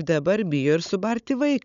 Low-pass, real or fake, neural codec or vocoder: 7.2 kHz; fake; codec, 16 kHz, 16 kbps, FunCodec, trained on Chinese and English, 50 frames a second